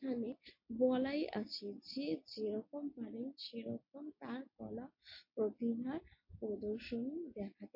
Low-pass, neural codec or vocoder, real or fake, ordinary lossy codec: 5.4 kHz; none; real; MP3, 32 kbps